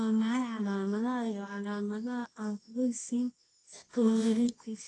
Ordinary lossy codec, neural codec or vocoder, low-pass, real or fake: AAC, 32 kbps; codec, 24 kHz, 0.9 kbps, WavTokenizer, medium music audio release; 10.8 kHz; fake